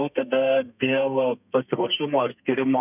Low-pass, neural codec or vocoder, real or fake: 3.6 kHz; codec, 32 kHz, 1.9 kbps, SNAC; fake